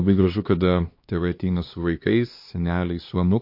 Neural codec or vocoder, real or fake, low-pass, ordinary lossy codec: codec, 16 kHz, 2 kbps, X-Codec, HuBERT features, trained on LibriSpeech; fake; 5.4 kHz; MP3, 32 kbps